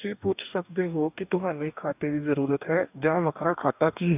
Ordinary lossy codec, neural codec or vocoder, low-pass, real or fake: none; codec, 44.1 kHz, 2.6 kbps, DAC; 3.6 kHz; fake